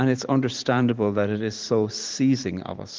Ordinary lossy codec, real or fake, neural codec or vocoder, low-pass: Opus, 16 kbps; fake; vocoder, 44.1 kHz, 128 mel bands every 512 samples, BigVGAN v2; 7.2 kHz